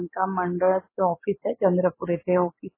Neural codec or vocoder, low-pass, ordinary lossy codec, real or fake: none; 3.6 kHz; MP3, 16 kbps; real